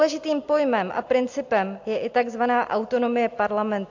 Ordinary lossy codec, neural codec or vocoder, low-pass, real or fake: AAC, 48 kbps; none; 7.2 kHz; real